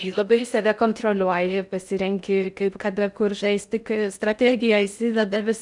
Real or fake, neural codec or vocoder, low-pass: fake; codec, 16 kHz in and 24 kHz out, 0.6 kbps, FocalCodec, streaming, 4096 codes; 10.8 kHz